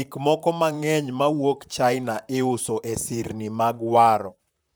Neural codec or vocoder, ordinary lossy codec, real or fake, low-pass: vocoder, 44.1 kHz, 128 mel bands, Pupu-Vocoder; none; fake; none